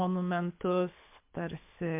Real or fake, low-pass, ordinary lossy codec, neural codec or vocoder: fake; 3.6 kHz; MP3, 32 kbps; codec, 24 kHz, 6 kbps, HILCodec